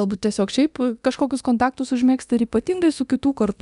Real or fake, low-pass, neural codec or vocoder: fake; 10.8 kHz; codec, 24 kHz, 0.9 kbps, DualCodec